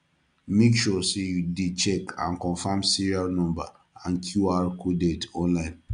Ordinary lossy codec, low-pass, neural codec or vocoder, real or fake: none; 9.9 kHz; none; real